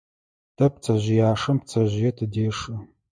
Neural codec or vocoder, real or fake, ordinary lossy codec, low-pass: none; real; MP3, 96 kbps; 9.9 kHz